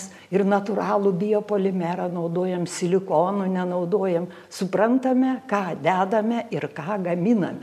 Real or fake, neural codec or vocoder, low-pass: real; none; 14.4 kHz